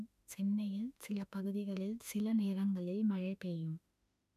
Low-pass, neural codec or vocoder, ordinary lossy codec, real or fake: 14.4 kHz; autoencoder, 48 kHz, 32 numbers a frame, DAC-VAE, trained on Japanese speech; none; fake